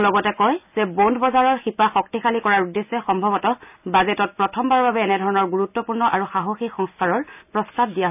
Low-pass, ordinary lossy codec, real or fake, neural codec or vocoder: 3.6 kHz; none; real; none